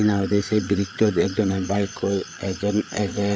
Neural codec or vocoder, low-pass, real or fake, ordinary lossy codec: codec, 16 kHz, 8 kbps, FreqCodec, larger model; none; fake; none